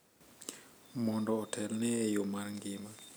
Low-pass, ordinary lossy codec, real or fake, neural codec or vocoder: none; none; real; none